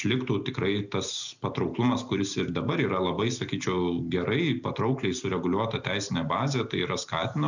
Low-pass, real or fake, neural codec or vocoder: 7.2 kHz; real; none